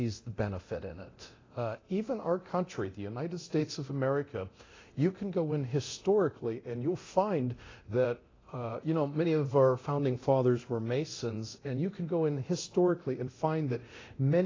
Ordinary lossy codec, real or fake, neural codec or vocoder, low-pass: AAC, 32 kbps; fake; codec, 24 kHz, 0.9 kbps, DualCodec; 7.2 kHz